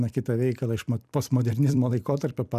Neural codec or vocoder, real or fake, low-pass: vocoder, 44.1 kHz, 128 mel bands every 256 samples, BigVGAN v2; fake; 14.4 kHz